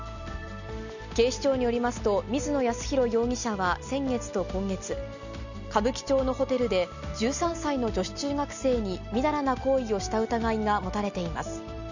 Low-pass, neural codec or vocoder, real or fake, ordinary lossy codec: 7.2 kHz; none; real; none